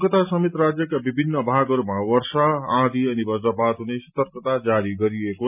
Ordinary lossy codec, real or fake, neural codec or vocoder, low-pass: none; real; none; 3.6 kHz